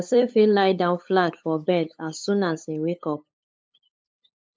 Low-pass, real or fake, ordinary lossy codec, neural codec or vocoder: none; fake; none; codec, 16 kHz, 8 kbps, FunCodec, trained on LibriTTS, 25 frames a second